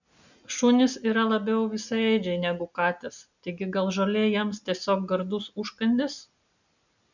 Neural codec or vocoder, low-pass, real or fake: none; 7.2 kHz; real